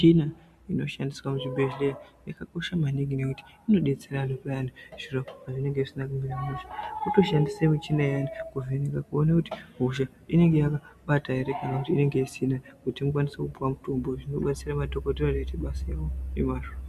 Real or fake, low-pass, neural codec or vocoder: real; 14.4 kHz; none